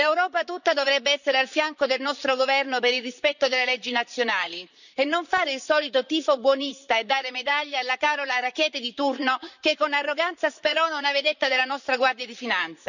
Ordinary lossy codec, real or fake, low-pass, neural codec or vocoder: none; fake; 7.2 kHz; vocoder, 44.1 kHz, 128 mel bands, Pupu-Vocoder